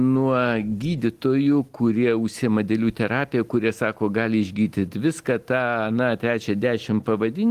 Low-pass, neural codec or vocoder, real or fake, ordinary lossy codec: 14.4 kHz; none; real; Opus, 24 kbps